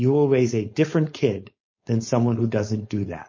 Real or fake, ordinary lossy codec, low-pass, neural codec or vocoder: fake; MP3, 32 kbps; 7.2 kHz; codec, 16 kHz, 4.8 kbps, FACodec